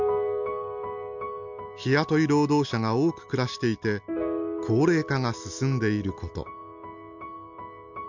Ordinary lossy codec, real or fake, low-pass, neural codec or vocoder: none; real; 7.2 kHz; none